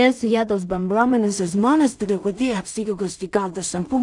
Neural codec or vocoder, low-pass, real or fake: codec, 16 kHz in and 24 kHz out, 0.4 kbps, LongCat-Audio-Codec, two codebook decoder; 10.8 kHz; fake